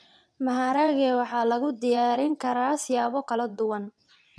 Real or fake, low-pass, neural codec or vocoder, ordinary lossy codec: fake; none; vocoder, 22.05 kHz, 80 mel bands, WaveNeXt; none